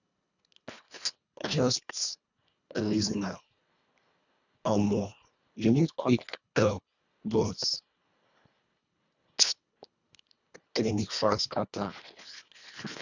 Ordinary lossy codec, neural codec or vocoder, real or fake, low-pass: none; codec, 24 kHz, 1.5 kbps, HILCodec; fake; 7.2 kHz